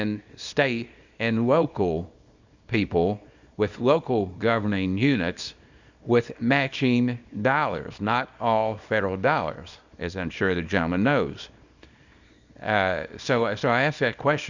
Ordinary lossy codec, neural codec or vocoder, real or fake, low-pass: Opus, 64 kbps; codec, 24 kHz, 0.9 kbps, WavTokenizer, small release; fake; 7.2 kHz